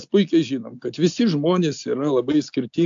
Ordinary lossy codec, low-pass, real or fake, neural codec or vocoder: AAC, 64 kbps; 7.2 kHz; real; none